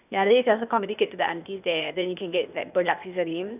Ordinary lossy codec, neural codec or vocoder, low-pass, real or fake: none; codec, 16 kHz, 0.8 kbps, ZipCodec; 3.6 kHz; fake